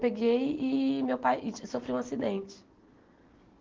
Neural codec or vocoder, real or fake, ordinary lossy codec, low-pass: none; real; Opus, 16 kbps; 7.2 kHz